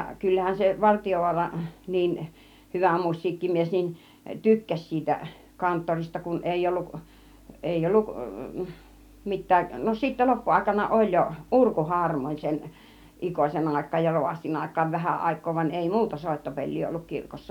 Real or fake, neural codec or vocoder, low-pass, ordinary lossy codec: real; none; 19.8 kHz; none